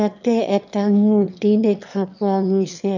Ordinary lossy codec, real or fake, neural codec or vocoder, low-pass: none; fake; autoencoder, 22.05 kHz, a latent of 192 numbers a frame, VITS, trained on one speaker; 7.2 kHz